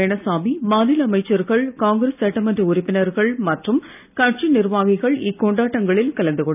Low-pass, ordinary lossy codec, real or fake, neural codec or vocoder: 3.6 kHz; none; real; none